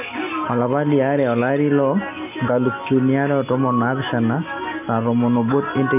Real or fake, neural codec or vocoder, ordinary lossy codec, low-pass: real; none; none; 3.6 kHz